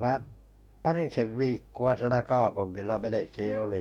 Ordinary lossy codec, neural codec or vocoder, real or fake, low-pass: MP3, 96 kbps; codec, 44.1 kHz, 2.6 kbps, DAC; fake; 19.8 kHz